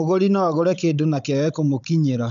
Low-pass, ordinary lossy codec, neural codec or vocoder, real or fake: 7.2 kHz; none; codec, 16 kHz, 16 kbps, FunCodec, trained on Chinese and English, 50 frames a second; fake